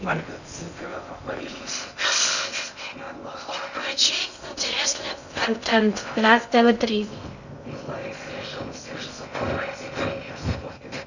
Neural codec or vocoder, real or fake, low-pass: codec, 16 kHz in and 24 kHz out, 0.6 kbps, FocalCodec, streaming, 2048 codes; fake; 7.2 kHz